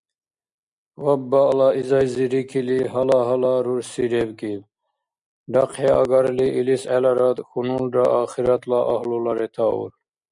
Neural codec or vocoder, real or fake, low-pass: none; real; 10.8 kHz